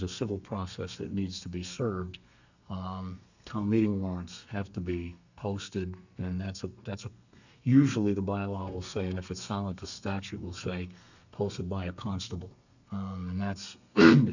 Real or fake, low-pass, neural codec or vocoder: fake; 7.2 kHz; codec, 32 kHz, 1.9 kbps, SNAC